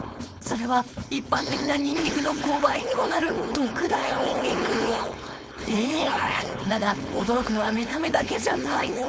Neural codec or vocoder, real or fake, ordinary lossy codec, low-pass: codec, 16 kHz, 4.8 kbps, FACodec; fake; none; none